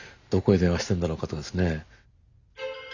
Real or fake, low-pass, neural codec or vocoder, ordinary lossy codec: real; 7.2 kHz; none; none